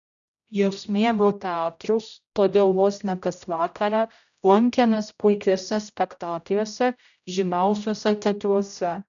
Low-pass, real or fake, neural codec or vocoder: 7.2 kHz; fake; codec, 16 kHz, 0.5 kbps, X-Codec, HuBERT features, trained on general audio